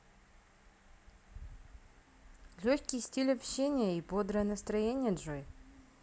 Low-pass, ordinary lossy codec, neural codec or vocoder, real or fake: none; none; none; real